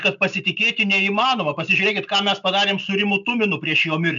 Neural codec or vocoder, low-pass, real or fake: none; 7.2 kHz; real